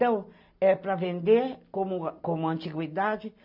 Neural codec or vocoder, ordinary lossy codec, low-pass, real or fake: none; MP3, 48 kbps; 5.4 kHz; real